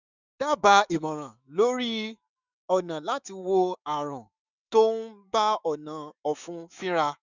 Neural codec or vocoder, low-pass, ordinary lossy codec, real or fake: autoencoder, 48 kHz, 128 numbers a frame, DAC-VAE, trained on Japanese speech; 7.2 kHz; none; fake